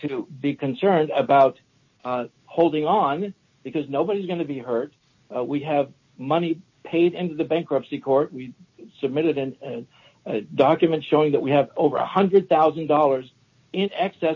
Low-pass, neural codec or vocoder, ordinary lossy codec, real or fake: 7.2 kHz; none; MP3, 32 kbps; real